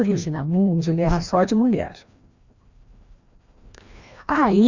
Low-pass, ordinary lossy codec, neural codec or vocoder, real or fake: 7.2 kHz; Opus, 64 kbps; codec, 16 kHz, 1 kbps, FreqCodec, larger model; fake